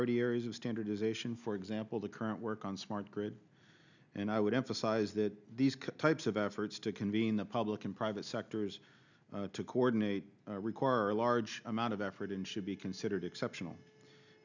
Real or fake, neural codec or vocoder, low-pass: real; none; 7.2 kHz